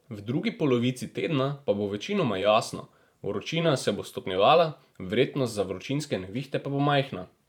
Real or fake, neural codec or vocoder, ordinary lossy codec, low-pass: fake; vocoder, 44.1 kHz, 128 mel bands every 512 samples, BigVGAN v2; none; 19.8 kHz